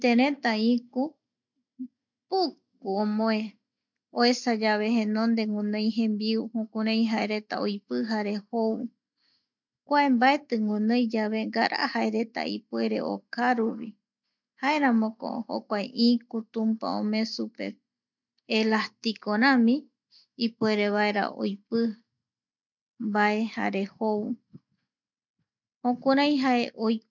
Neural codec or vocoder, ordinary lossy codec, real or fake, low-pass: none; MP3, 64 kbps; real; 7.2 kHz